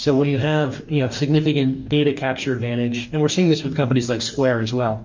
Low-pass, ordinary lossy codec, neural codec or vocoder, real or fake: 7.2 kHz; MP3, 48 kbps; codec, 44.1 kHz, 2.6 kbps, DAC; fake